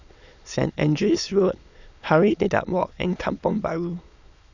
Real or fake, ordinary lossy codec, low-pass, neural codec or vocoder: fake; none; 7.2 kHz; autoencoder, 22.05 kHz, a latent of 192 numbers a frame, VITS, trained on many speakers